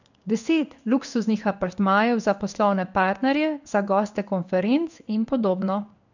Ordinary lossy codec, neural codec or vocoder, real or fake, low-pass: none; codec, 16 kHz in and 24 kHz out, 1 kbps, XY-Tokenizer; fake; 7.2 kHz